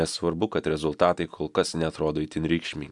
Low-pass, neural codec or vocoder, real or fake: 10.8 kHz; none; real